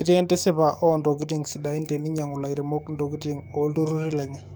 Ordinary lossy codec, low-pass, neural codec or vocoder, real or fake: none; none; codec, 44.1 kHz, 7.8 kbps, DAC; fake